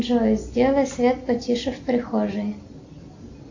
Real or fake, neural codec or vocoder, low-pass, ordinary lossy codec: real; none; 7.2 kHz; AAC, 48 kbps